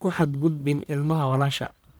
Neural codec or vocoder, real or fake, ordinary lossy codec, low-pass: codec, 44.1 kHz, 1.7 kbps, Pupu-Codec; fake; none; none